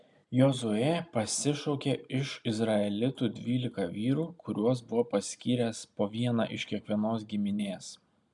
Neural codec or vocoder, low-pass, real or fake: none; 10.8 kHz; real